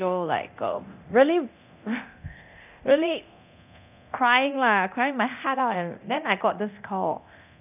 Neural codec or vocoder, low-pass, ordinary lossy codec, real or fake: codec, 24 kHz, 0.9 kbps, DualCodec; 3.6 kHz; none; fake